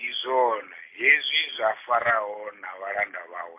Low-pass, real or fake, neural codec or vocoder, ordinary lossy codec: 3.6 kHz; real; none; MP3, 24 kbps